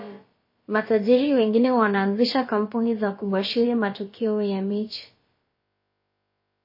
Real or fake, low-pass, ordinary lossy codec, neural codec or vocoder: fake; 5.4 kHz; MP3, 24 kbps; codec, 16 kHz, about 1 kbps, DyCAST, with the encoder's durations